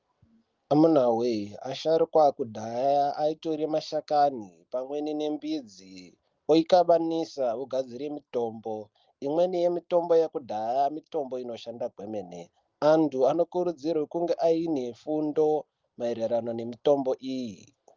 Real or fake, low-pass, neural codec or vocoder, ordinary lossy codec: real; 7.2 kHz; none; Opus, 32 kbps